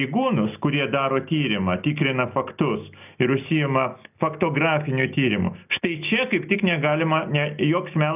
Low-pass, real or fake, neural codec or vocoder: 3.6 kHz; real; none